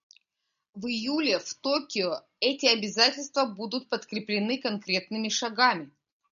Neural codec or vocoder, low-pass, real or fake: none; 7.2 kHz; real